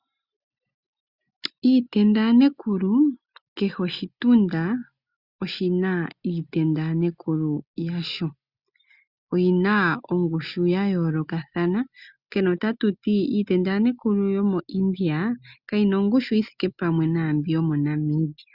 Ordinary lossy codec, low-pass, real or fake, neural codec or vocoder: Opus, 64 kbps; 5.4 kHz; real; none